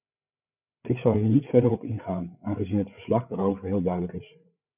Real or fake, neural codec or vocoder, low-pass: fake; codec, 16 kHz, 8 kbps, FreqCodec, larger model; 3.6 kHz